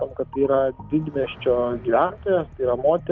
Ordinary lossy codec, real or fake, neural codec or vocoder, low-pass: Opus, 24 kbps; real; none; 7.2 kHz